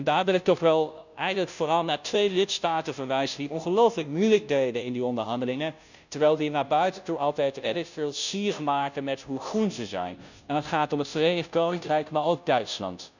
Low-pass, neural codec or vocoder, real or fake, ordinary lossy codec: 7.2 kHz; codec, 16 kHz, 0.5 kbps, FunCodec, trained on Chinese and English, 25 frames a second; fake; none